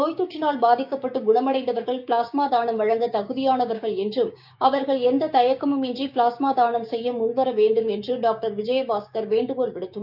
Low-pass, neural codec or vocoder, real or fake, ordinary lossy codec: 5.4 kHz; codec, 16 kHz, 6 kbps, DAC; fake; none